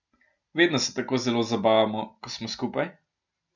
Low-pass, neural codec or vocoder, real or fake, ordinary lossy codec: 7.2 kHz; none; real; none